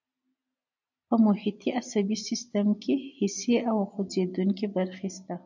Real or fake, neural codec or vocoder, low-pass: real; none; 7.2 kHz